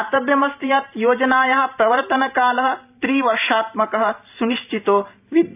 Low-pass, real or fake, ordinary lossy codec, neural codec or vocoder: 3.6 kHz; fake; AAC, 32 kbps; vocoder, 44.1 kHz, 128 mel bands every 512 samples, BigVGAN v2